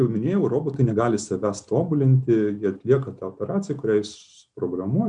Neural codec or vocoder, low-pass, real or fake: none; 9.9 kHz; real